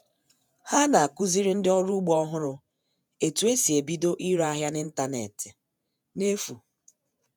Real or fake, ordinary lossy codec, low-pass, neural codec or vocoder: fake; none; none; vocoder, 48 kHz, 128 mel bands, Vocos